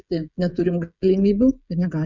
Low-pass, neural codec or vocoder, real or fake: 7.2 kHz; codec, 16 kHz, 16 kbps, FreqCodec, smaller model; fake